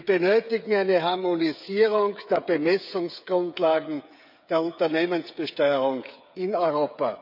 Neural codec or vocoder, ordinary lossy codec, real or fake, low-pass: codec, 16 kHz, 8 kbps, FreqCodec, smaller model; none; fake; 5.4 kHz